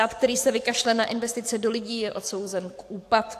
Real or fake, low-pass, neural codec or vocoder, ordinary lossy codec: fake; 14.4 kHz; codec, 44.1 kHz, 7.8 kbps, DAC; AAC, 64 kbps